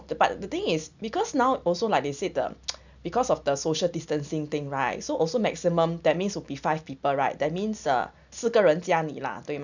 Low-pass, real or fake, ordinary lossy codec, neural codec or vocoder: 7.2 kHz; real; none; none